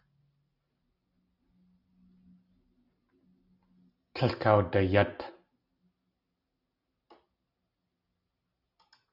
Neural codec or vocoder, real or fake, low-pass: none; real; 5.4 kHz